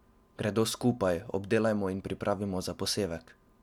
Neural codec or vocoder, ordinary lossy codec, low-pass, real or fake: none; none; 19.8 kHz; real